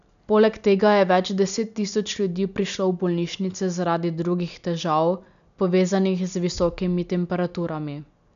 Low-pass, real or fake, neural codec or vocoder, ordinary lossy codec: 7.2 kHz; real; none; none